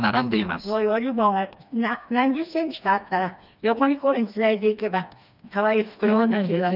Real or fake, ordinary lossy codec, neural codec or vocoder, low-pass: fake; none; codec, 16 kHz, 2 kbps, FreqCodec, smaller model; 5.4 kHz